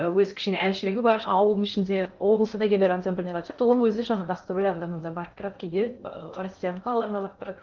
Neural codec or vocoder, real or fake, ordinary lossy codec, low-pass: codec, 16 kHz in and 24 kHz out, 0.8 kbps, FocalCodec, streaming, 65536 codes; fake; Opus, 24 kbps; 7.2 kHz